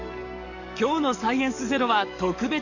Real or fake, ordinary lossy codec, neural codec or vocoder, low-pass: fake; none; codec, 44.1 kHz, 7.8 kbps, DAC; 7.2 kHz